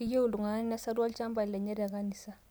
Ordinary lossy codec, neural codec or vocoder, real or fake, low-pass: none; none; real; none